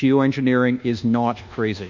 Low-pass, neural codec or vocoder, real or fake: 7.2 kHz; codec, 24 kHz, 1.2 kbps, DualCodec; fake